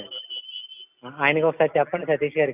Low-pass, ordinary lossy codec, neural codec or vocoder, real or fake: 3.6 kHz; none; none; real